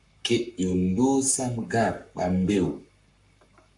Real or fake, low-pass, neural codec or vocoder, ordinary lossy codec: fake; 10.8 kHz; codec, 44.1 kHz, 7.8 kbps, Pupu-Codec; MP3, 96 kbps